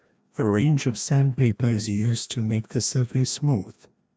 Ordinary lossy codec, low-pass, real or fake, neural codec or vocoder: none; none; fake; codec, 16 kHz, 1 kbps, FreqCodec, larger model